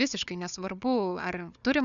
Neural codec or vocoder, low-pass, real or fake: codec, 16 kHz, 4 kbps, FunCodec, trained on Chinese and English, 50 frames a second; 7.2 kHz; fake